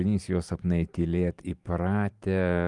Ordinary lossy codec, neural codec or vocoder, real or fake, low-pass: Opus, 32 kbps; vocoder, 44.1 kHz, 128 mel bands every 512 samples, BigVGAN v2; fake; 10.8 kHz